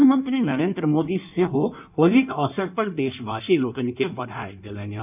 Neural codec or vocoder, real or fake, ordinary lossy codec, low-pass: codec, 16 kHz in and 24 kHz out, 1.1 kbps, FireRedTTS-2 codec; fake; none; 3.6 kHz